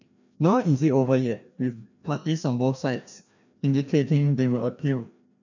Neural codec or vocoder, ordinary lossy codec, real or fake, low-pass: codec, 16 kHz, 1 kbps, FreqCodec, larger model; none; fake; 7.2 kHz